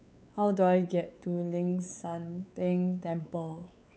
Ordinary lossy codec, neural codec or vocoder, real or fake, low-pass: none; codec, 16 kHz, 4 kbps, X-Codec, WavLM features, trained on Multilingual LibriSpeech; fake; none